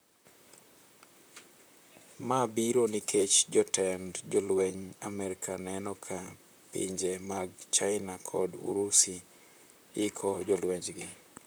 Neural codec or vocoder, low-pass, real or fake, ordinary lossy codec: vocoder, 44.1 kHz, 128 mel bands, Pupu-Vocoder; none; fake; none